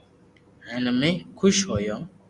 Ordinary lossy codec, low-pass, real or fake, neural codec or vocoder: Opus, 64 kbps; 10.8 kHz; real; none